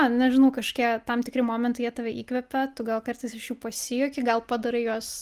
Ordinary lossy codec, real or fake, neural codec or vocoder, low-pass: Opus, 24 kbps; real; none; 14.4 kHz